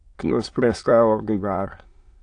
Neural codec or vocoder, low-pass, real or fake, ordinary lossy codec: autoencoder, 22.05 kHz, a latent of 192 numbers a frame, VITS, trained on many speakers; 9.9 kHz; fake; AAC, 48 kbps